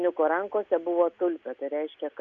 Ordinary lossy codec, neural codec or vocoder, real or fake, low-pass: AAC, 64 kbps; none; real; 7.2 kHz